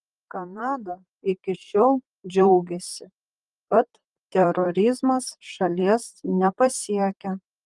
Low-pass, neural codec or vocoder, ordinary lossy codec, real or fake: 10.8 kHz; vocoder, 44.1 kHz, 128 mel bands, Pupu-Vocoder; Opus, 32 kbps; fake